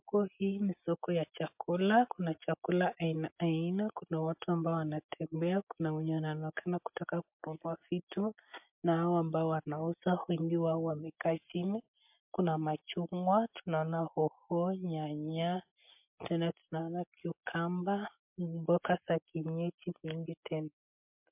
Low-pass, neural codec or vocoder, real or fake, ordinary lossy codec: 3.6 kHz; none; real; MP3, 32 kbps